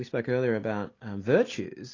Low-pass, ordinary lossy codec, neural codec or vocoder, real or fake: 7.2 kHz; AAC, 32 kbps; none; real